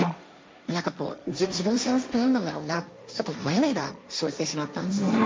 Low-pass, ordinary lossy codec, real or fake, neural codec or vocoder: none; none; fake; codec, 16 kHz, 1.1 kbps, Voila-Tokenizer